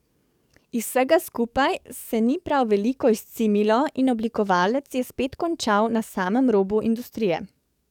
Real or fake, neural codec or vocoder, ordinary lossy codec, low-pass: fake; codec, 44.1 kHz, 7.8 kbps, DAC; none; 19.8 kHz